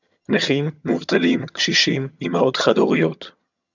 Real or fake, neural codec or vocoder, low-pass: fake; vocoder, 22.05 kHz, 80 mel bands, HiFi-GAN; 7.2 kHz